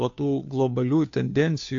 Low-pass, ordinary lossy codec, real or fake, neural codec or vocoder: 7.2 kHz; AAC, 48 kbps; fake; codec, 16 kHz, 2 kbps, FunCodec, trained on Chinese and English, 25 frames a second